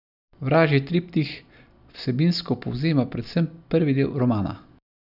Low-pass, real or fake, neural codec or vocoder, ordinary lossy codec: 5.4 kHz; real; none; none